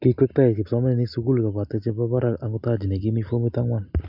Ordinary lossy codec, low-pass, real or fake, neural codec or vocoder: none; 5.4 kHz; real; none